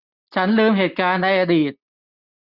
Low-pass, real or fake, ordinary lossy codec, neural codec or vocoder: 5.4 kHz; real; none; none